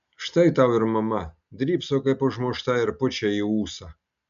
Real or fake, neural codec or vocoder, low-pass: real; none; 7.2 kHz